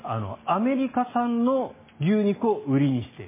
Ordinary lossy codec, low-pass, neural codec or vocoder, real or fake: MP3, 16 kbps; 3.6 kHz; none; real